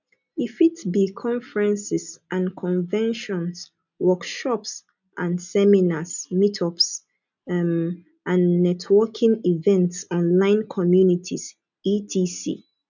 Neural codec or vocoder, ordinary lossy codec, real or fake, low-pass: none; none; real; 7.2 kHz